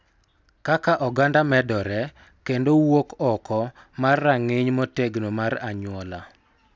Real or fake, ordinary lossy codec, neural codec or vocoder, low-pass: real; none; none; none